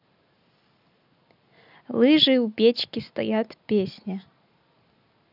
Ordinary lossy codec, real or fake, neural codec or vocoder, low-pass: none; real; none; 5.4 kHz